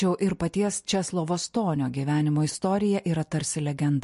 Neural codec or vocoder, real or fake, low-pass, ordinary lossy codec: none; real; 14.4 kHz; MP3, 48 kbps